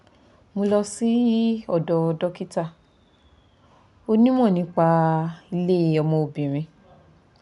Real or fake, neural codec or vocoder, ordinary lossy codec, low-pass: real; none; none; 10.8 kHz